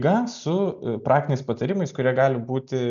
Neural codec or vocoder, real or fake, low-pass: none; real; 7.2 kHz